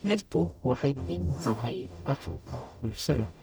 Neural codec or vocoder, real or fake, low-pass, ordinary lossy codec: codec, 44.1 kHz, 0.9 kbps, DAC; fake; none; none